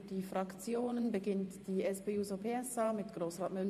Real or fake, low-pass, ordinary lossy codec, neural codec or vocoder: fake; 14.4 kHz; MP3, 64 kbps; vocoder, 44.1 kHz, 128 mel bands every 512 samples, BigVGAN v2